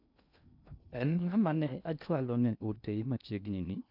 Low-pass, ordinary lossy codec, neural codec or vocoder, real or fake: 5.4 kHz; none; codec, 16 kHz in and 24 kHz out, 0.6 kbps, FocalCodec, streaming, 2048 codes; fake